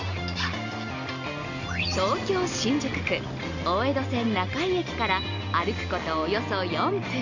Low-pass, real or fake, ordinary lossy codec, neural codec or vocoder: 7.2 kHz; real; none; none